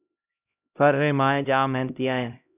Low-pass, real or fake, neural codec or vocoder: 3.6 kHz; fake; codec, 16 kHz, 0.5 kbps, X-Codec, HuBERT features, trained on LibriSpeech